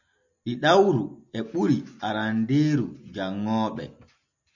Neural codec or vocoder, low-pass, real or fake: none; 7.2 kHz; real